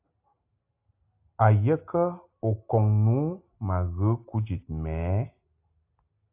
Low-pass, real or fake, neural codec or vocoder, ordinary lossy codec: 3.6 kHz; real; none; AAC, 32 kbps